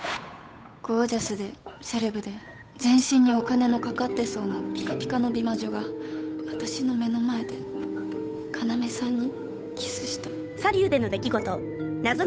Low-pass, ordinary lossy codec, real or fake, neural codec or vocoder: none; none; fake; codec, 16 kHz, 8 kbps, FunCodec, trained on Chinese and English, 25 frames a second